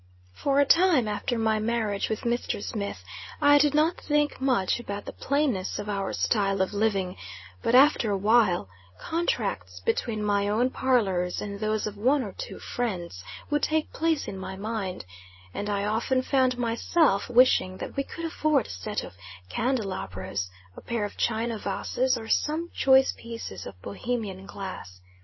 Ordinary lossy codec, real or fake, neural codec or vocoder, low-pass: MP3, 24 kbps; real; none; 7.2 kHz